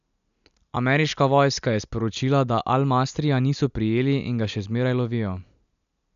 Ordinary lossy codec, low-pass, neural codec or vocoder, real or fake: none; 7.2 kHz; none; real